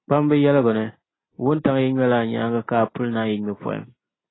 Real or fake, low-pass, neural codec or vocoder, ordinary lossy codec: real; 7.2 kHz; none; AAC, 16 kbps